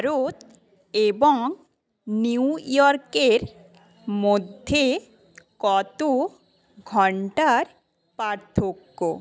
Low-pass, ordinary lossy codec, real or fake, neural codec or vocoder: none; none; real; none